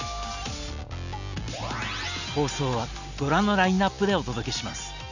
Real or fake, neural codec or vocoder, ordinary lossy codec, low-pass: real; none; none; 7.2 kHz